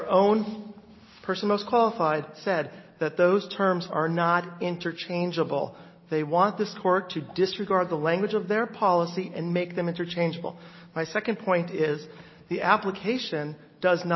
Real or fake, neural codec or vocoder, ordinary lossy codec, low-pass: real; none; MP3, 24 kbps; 7.2 kHz